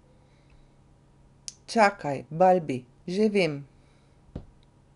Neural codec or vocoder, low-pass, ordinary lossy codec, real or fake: none; 10.8 kHz; none; real